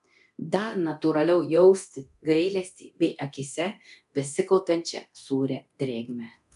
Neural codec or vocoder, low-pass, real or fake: codec, 24 kHz, 0.9 kbps, DualCodec; 10.8 kHz; fake